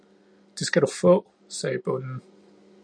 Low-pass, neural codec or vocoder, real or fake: 9.9 kHz; none; real